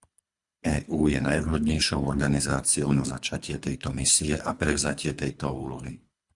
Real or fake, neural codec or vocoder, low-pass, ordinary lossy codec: fake; codec, 24 kHz, 3 kbps, HILCodec; 10.8 kHz; Opus, 64 kbps